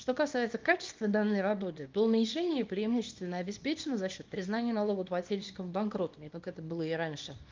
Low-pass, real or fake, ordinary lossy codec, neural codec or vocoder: 7.2 kHz; fake; Opus, 24 kbps; codec, 24 kHz, 0.9 kbps, WavTokenizer, small release